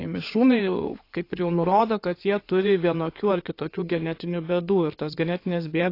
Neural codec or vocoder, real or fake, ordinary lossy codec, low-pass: codec, 16 kHz in and 24 kHz out, 2.2 kbps, FireRedTTS-2 codec; fake; AAC, 32 kbps; 5.4 kHz